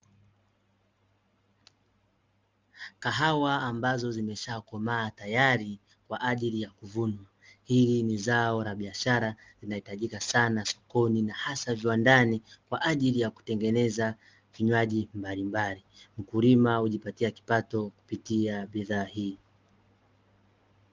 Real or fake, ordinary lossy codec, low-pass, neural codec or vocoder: real; Opus, 32 kbps; 7.2 kHz; none